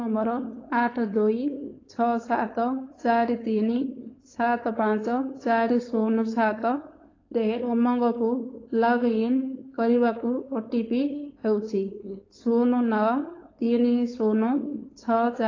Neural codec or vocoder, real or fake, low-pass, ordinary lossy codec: codec, 16 kHz, 4.8 kbps, FACodec; fake; 7.2 kHz; AAC, 32 kbps